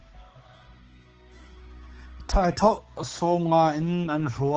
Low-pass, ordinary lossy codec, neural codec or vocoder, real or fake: 7.2 kHz; Opus, 16 kbps; codec, 16 kHz, 4 kbps, X-Codec, HuBERT features, trained on balanced general audio; fake